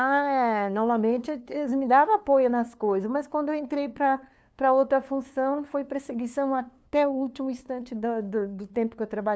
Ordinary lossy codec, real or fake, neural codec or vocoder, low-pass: none; fake; codec, 16 kHz, 2 kbps, FunCodec, trained on LibriTTS, 25 frames a second; none